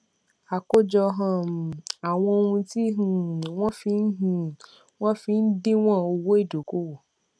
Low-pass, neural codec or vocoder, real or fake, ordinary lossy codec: none; none; real; none